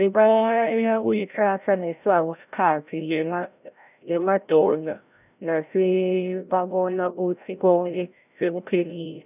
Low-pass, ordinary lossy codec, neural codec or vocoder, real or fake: 3.6 kHz; none; codec, 16 kHz, 0.5 kbps, FreqCodec, larger model; fake